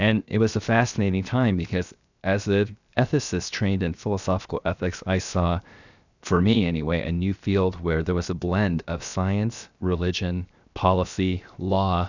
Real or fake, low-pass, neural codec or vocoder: fake; 7.2 kHz; codec, 16 kHz, 0.7 kbps, FocalCodec